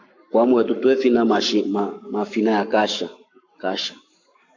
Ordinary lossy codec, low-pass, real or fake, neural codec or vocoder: MP3, 48 kbps; 7.2 kHz; fake; codec, 44.1 kHz, 7.8 kbps, Pupu-Codec